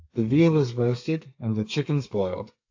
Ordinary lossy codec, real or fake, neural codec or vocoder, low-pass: AAC, 48 kbps; fake; codec, 32 kHz, 1.9 kbps, SNAC; 7.2 kHz